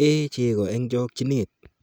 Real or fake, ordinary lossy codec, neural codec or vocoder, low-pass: real; none; none; none